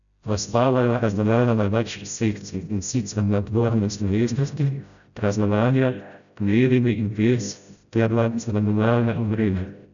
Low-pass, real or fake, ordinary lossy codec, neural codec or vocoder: 7.2 kHz; fake; none; codec, 16 kHz, 0.5 kbps, FreqCodec, smaller model